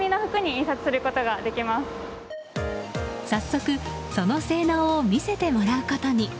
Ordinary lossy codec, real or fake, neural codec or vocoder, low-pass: none; real; none; none